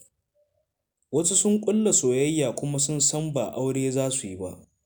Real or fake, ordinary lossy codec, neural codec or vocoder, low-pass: real; none; none; none